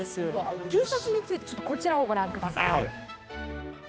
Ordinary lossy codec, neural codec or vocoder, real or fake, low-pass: none; codec, 16 kHz, 2 kbps, X-Codec, HuBERT features, trained on balanced general audio; fake; none